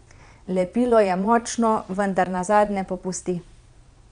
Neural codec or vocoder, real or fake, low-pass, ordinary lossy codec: vocoder, 22.05 kHz, 80 mel bands, Vocos; fake; 9.9 kHz; none